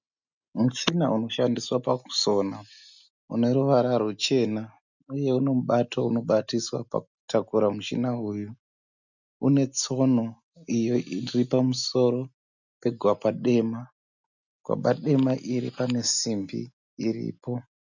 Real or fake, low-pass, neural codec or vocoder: real; 7.2 kHz; none